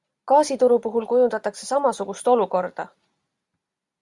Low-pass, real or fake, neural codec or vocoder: 10.8 kHz; real; none